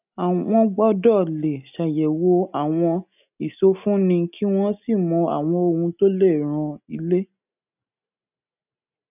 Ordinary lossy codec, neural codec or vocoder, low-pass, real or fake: none; none; 3.6 kHz; real